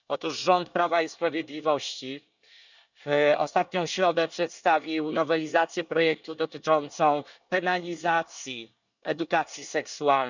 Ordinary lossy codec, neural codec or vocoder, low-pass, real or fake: none; codec, 24 kHz, 1 kbps, SNAC; 7.2 kHz; fake